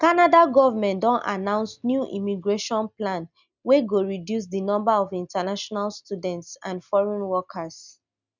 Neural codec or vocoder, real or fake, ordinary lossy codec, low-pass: none; real; none; 7.2 kHz